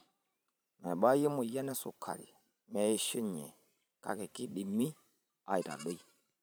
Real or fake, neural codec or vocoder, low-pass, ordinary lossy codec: fake; vocoder, 44.1 kHz, 128 mel bands every 512 samples, BigVGAN v2; none; none